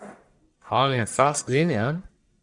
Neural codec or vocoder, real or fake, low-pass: codec, 44.1 kHz, 1.7 kbps, Pupu-Codec; fake; 10.8 kHz